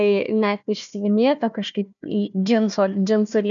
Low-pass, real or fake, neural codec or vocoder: 7.2 kHz; fake; codec, 16 kHz, 1 kbps, FunCodec, trained on Chinese and English, 50 frames a second